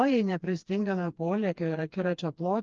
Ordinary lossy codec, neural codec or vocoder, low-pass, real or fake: Opus, 24 kbps; codec, 16 kHz, 2 kbps, FreqCodec, smaller model; 7.2 kHz; fake